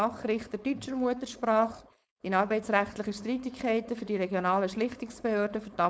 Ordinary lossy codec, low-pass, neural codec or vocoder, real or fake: none; none; codec, 16 kHz, 4.8 kbps, FACodec; fake